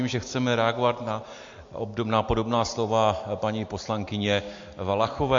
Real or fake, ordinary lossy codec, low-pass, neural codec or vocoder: real; MP3, 48 kbps; 7.2 kHz; none